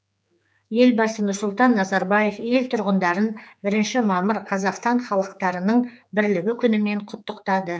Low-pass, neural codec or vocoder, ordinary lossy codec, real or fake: none; codec, 16 kHz, 4 kbps, X-Codec, HuBERT features, trained on general audio; none; fake